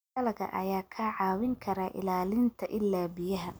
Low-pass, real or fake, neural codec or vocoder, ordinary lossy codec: none; real; none; none